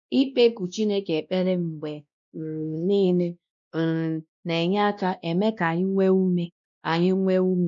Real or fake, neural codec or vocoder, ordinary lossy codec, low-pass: fake; codec, 16 kHz, 1 kbps, X-Codec, WavLM features, trained on Multilingual LibriSpeech; none; 7.2 kHz